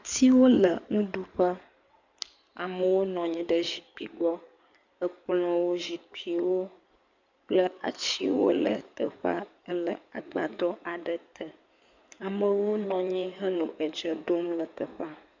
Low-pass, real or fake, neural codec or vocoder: 7.2 kHz; fake; codec, 16 kHz in and 24 kHz out, 2.2 kbps, FireRedTTS-2 codec